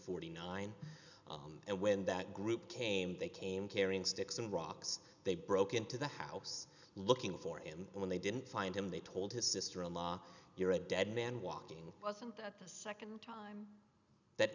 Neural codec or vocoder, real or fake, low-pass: none; real; 7.2 kHz